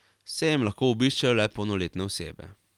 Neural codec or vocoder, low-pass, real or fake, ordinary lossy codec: none; 19.8 kHz; real; Opus, 32 kbps